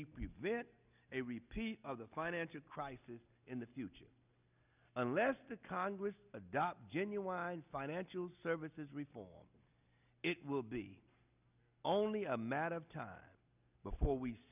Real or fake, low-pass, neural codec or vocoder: real; 3.6 kHz; none